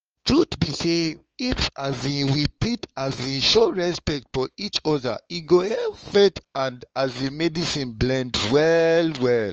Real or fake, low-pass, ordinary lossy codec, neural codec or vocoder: fake; 7.2 kHz; Opus, 24 kbps; codec, 16 kHz, 4 kbps, X-Codec, WavLM features, trained on Multilingual LibriSpeech